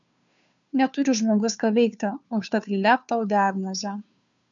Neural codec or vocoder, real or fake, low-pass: codec, 16 kHz, 2 kbps, FunCodec, trained on Chinese and English, 25 frames a second; fake; 7.2 kHz